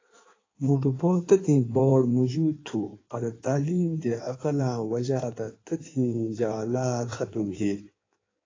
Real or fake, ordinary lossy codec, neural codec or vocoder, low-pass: fake; AAC, 32 kbps; codec, 16 kHz in and 24 kHz out, 1.1 kbps, FireRedTTS-2 codec; 7.2 kHz